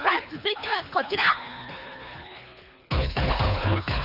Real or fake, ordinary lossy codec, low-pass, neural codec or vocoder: fake; none; 5.4 kHz; codec, 24 kHz, 3 kbps, HILCodec